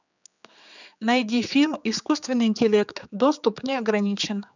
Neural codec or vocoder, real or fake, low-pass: codec, 16 kHz, 4 kbps, X-Codec, HuBERT features, trained on general audio; fake; 7.2 kHz